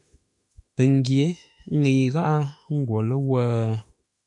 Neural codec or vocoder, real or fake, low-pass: autoencoder, 48 kHz, 32 numbers a frame, DAC-VAE, trained on Japanese speech; fake; 10.8 kHz